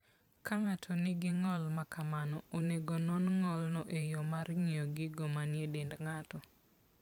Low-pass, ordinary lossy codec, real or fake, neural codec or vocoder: 19.8 kHz; none; fake; vocoder, 44.1 kHz, 128 mel bands every 512 samples, BigVGAN v2